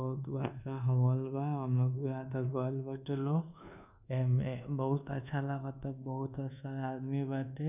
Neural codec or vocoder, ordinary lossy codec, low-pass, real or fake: codec, 24 kHz, 1.2 kbps, DualCodec; none; 3.6 kHz; fake